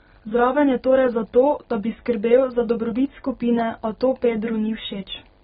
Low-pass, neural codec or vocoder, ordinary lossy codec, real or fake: 19.8 kHz; none; AAC, 16 kbps; real